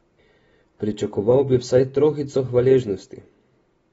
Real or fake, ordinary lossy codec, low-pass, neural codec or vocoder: real; AAC, 24 kbps; 10.8 kHz; none